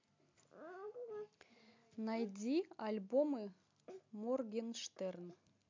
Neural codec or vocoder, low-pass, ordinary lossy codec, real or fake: none; 7.2 kHz; MP3, 64 kbps; real